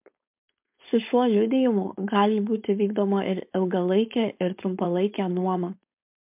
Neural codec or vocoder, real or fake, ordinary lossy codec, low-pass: codec, 16 kHz, 4.8 kbps, FACodec; fake; MP3, 32 kbps; 3.6 kHz